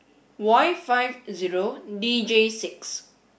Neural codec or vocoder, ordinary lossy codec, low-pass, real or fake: none; none; none; real